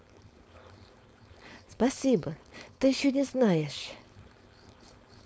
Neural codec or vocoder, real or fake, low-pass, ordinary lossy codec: codec, 16 kHz, 4.8 kbps, FACodec; fake; none; none